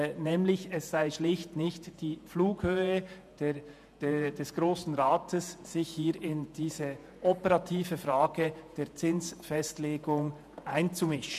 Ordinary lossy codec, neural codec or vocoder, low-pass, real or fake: MP3, 96 kbps; vocoder, 48 kHz, 128 mel bands, Vocos; 14.4 kHz; fake